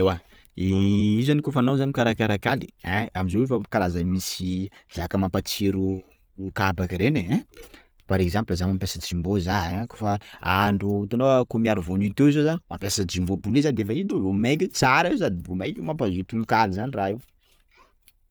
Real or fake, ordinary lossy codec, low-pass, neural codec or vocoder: real; none; none; none